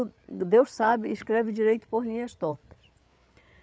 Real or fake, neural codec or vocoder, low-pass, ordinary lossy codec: fake; codec, 16 kHz, 8 kbps, FreqCodec, larger model; none; none